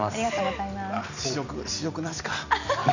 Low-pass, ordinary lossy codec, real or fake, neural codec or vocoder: 7.2 kHz; none; real; none